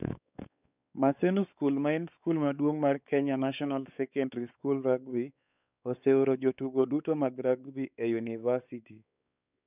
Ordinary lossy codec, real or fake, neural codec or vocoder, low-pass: none; fake; codec, 16 kHz, 4 kbps, X-Codec, WavLM features, trained on Multilingual LibriSpeech; 3.6 kHz